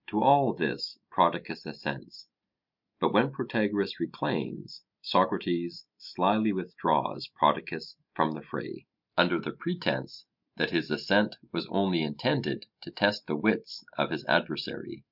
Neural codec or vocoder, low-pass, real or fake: none; 5.4 kHz; real